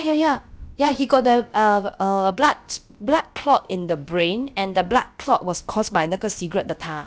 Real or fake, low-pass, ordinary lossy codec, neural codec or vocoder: fake; none; none; codec, 16 kHz, about 1 kbps, DyCAST, with the encoder's durations